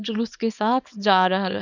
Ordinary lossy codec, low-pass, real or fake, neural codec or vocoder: none; 7.2 kHz; fake; codec, 24 kHz, 0.9 kbps, WavTokenizer, small release